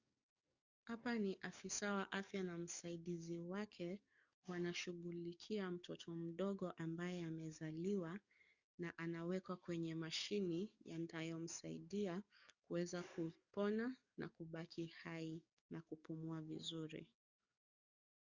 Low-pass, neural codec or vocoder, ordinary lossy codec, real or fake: 7.2 kHz; codec, 44.1 kHz, 7.8 kbps, DAC; Opus, 64 kbps; fake